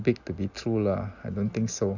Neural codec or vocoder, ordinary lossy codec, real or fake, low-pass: none; none; real; 7.2 kHz